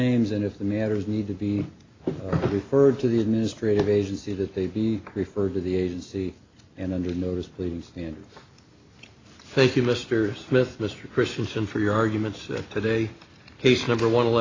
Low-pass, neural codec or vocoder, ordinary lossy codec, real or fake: 7.2 kHz; none; AAC, 32 kbps; real